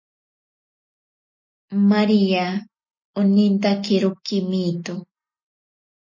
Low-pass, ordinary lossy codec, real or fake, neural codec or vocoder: 7.2 kHz; MP3, 32 kbps; real; none